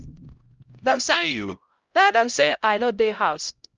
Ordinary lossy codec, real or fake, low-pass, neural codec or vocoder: Opus, 24 kbps; fake; 7.2 kHz; codec, 16 kHz, 0.5 kbps, X-Codec, HuBERT features, trained on LibriSpeech